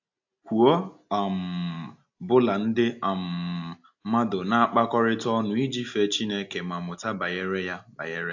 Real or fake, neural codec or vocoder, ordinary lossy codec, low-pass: real; none; AAC, 48 kbps; 7.2 kHz